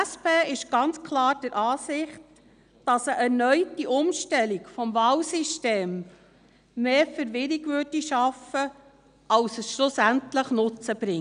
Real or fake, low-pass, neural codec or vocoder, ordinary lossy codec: real; 9.9 kHz; none; none